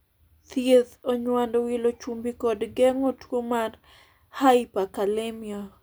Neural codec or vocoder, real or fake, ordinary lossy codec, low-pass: none; real; none; none